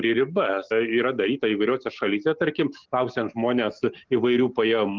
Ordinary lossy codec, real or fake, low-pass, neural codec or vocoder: Opus, 32 kbps; real; 7.2 kHz; none